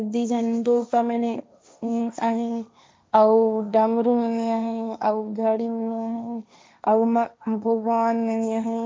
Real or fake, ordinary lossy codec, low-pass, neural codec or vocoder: fake; none; none; codec, 16 kHz, 1.1 kbps, Voila-Tokenizer